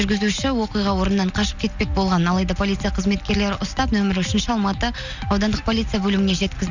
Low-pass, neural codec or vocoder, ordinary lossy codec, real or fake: 7.2 kHz; none; none; real